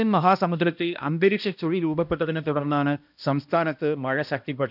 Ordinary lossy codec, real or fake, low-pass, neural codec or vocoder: none; fake; 5.4 kHz; codec, 16 kHz, 1 kbps, X-Codec, HuBERT features, trained on balanced general audio